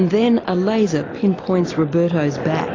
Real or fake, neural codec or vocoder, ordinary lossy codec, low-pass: real; none; AAC, 32 kbps; 7.2 kHz